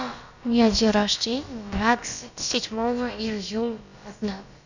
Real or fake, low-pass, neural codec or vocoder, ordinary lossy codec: fake; 7.2 kHz; codec, 16 kHz, about 1 kbps, DyCAST, with the encoder's durations; none